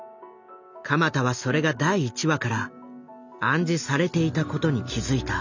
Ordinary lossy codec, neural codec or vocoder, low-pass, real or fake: none; none; 7.2 kHz; real